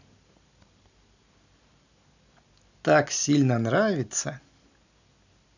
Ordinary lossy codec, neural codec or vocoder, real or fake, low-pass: none; none; real; 7.2 kHz